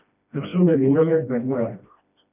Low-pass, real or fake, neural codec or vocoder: 3.6 kHz; fake; codec, 16 kHz, 1 kbps, FreqCodec, smaller model